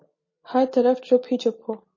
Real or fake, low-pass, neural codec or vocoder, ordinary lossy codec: real; 7.2 kHz; none; MP3, 32 kbps